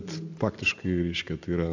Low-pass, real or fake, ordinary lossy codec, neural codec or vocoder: 7.2 kHz; real; AAC, 48 kbps; none